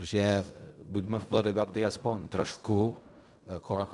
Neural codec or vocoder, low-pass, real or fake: codec, 16 kHz in and 24 kHz out, 0.4 kbps, LongCat-Audio-Codec, fine tuned four codebook decoder; 10.8 kHz; fake